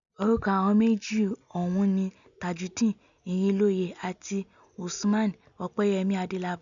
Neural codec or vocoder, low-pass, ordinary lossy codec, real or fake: none; 7.2 kHz; none; real